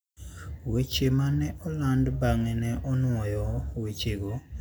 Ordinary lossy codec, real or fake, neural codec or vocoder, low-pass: none; real; none; none